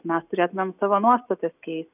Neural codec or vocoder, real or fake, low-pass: none; real; 3.6 kHz